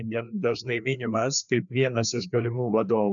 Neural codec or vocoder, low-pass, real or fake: codec, 16 kHz, 2 kbps, FreqCodec, larger model; 7.2 kHz; fake